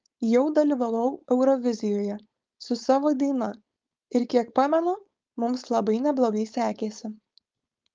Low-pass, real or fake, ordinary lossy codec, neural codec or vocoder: 7.2 kHz; fake; Opus, 24 kbps; codec, 16 kHz, 4.8 kbps, FACodec